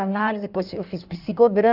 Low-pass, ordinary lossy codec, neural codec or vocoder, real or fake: 5.4 kHz; none; codec, 16 kHz in and 24 kHz out, 1.1 kbps, FireRedTTS-2 codec; fake